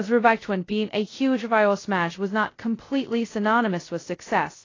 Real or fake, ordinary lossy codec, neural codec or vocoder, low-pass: fake; AAC, 32 kbps; codec, 16 kHz, 0.2 kbps, FocalCodec; 7.2 kHz